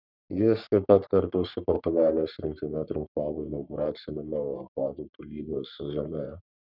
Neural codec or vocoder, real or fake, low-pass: codec, 44.1 kHz, 3.4 kbps, Pupu-Codec; fake; 5.4 kHz